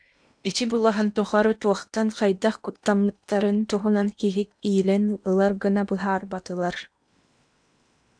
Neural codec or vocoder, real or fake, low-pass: codec, 16 kHz in and 24 kHz out, 0.8 kbps, FocalCodec, streaming, 65536 codes; fake; 9.9 kHz